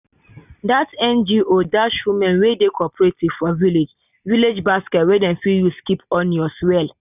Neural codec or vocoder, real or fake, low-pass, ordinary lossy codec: none; real; 3.6 kHz; none